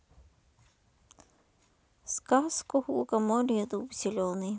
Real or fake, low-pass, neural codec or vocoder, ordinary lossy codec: real; none; none; none